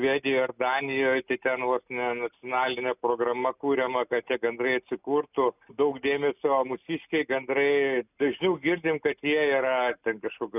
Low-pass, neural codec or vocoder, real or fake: 3.6 kHz; none; real